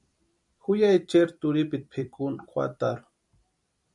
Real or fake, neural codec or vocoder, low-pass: fake; vocoder, 44.1 kHz, 128 mel bands every 256 samples, BigVGAN v2; 10.8 kHz